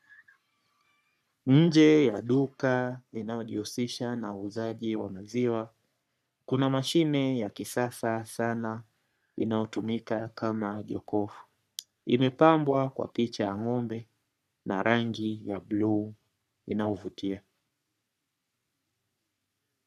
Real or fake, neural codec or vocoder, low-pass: fake; codec, 44.1 kHz, 3.4 kbps, Pupu-Codec; 14.4 kHz